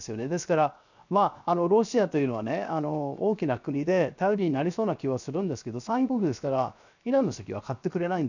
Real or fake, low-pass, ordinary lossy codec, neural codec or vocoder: fake; 7.2 kHz; none; codec, 16 kHz, 0.7 kbps, FocalCodec